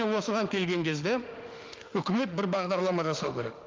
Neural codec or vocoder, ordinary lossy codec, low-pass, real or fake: autoencoder, 48 kHz, 32 numbers a frame, DAC-VAE, trained on Japanese speech; Opus, 24 kbps; 7.2 kHz; fake